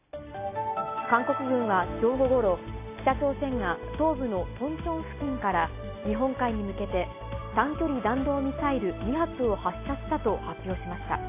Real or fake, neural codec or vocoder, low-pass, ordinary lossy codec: real; none; 3.6 kHz; AAC, 24 kbps